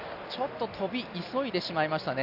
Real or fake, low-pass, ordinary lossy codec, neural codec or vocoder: real; 5.4 kHz; none; none